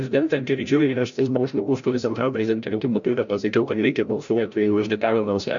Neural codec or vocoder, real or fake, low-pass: codec, 16 kHz, 0.5 kbps, FreqCodec, larger model; fake; 7.2 kHz